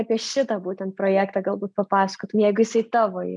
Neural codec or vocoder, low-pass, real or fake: none; 10.8 kHz; real